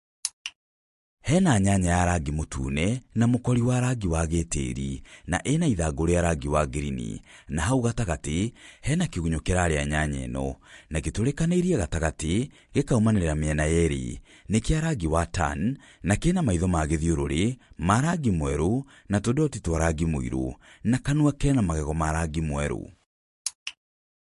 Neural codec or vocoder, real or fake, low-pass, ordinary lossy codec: none; real; 14.4 kHz; MP3, 48 kbps